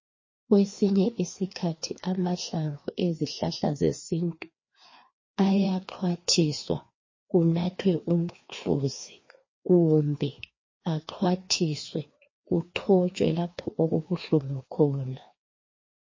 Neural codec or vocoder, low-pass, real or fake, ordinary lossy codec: codec, 16 kHz, 2 kbps, FreqCodec, larger model; 7.2 kHz; fake; MP3, 32 kbps